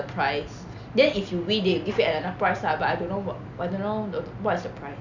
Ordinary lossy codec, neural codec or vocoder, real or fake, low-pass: none; none; real; 7.2 kHz